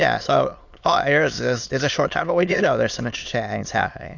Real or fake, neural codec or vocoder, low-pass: fake; autoencoder, 22.05 kHz, a latent of 192 numbers a frame, VITS, trained on many speakers; 7.2 kHz